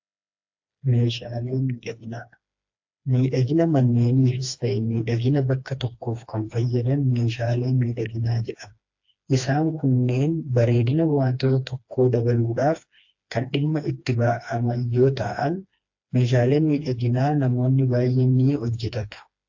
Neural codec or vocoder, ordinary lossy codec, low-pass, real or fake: codec, 16 kHz, 2 kbps, FreqCodec, smaller model; AAC, 48 kbps; 7.2 kHz; fake